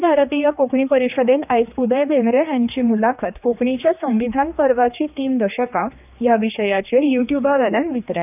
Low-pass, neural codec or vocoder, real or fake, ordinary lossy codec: 3.6 kHz; codec, 16 kHz, 2 kbps, X-Codec, HuBERT features, trained on general audio; fake; none